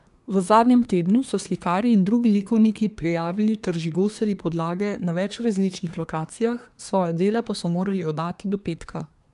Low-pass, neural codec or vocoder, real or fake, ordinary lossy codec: 10.8 kHz; codec, 24 kHz, 1 kbps, SNAC; fake; none